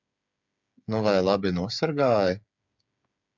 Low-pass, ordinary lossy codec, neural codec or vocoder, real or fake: 7.2 kHz; MP3, 64 kbps; codec, 16 kHz, 8 kbps, FreqCodec, smaller model; fake